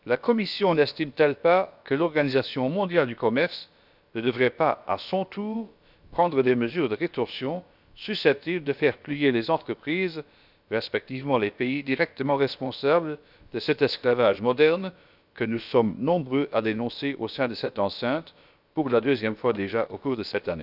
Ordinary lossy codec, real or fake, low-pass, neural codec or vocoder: none; fake; 5.4 kHz; codec, 16 kHz, about 1 kbps, DyCAST, with the encoder's durations